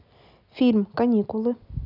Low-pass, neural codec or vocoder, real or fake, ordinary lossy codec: 5.4 kHz; none; real; none